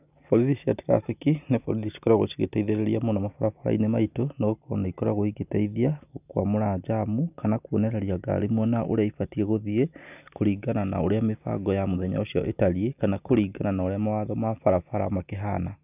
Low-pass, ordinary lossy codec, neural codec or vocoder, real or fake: 3.6 kHz; none; none; real